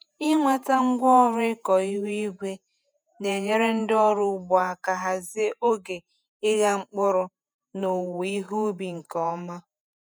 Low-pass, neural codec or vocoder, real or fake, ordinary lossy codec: none; vocoder, 48 kHz, 128 mel bands, Vocos; fake; none